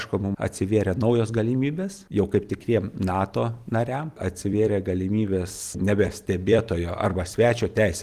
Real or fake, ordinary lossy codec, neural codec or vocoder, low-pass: fake; Opus, 24 kbps; vocoder, 44.1 kHz, 128 mel bands every 256 samples, BigVGAN v2; 14.4 kHz